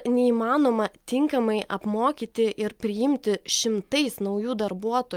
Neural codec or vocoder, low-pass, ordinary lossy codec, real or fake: none; 19.8 kHz; Opus, 32 kbps; real